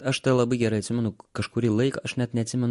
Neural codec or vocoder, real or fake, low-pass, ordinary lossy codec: none; real; 14.4 kHz; MP3, 48 kbps